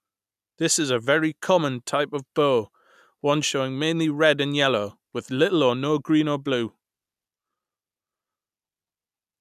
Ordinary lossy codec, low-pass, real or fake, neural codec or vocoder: none; 14.4 kHz; real; none